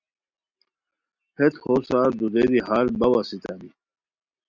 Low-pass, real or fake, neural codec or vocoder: 7.2 kHz; real; none